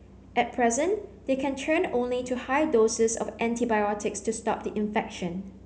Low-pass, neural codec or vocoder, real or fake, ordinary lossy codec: none; none; real; none